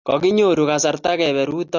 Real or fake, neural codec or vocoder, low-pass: real; none; 7.2 kHz